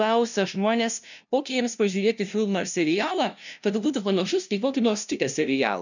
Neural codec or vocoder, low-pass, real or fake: codec, 16 kHz, 0.5 kbps, FunCodec, trained on LibriTTS, 25 frames a second; 7.2 kHz; fake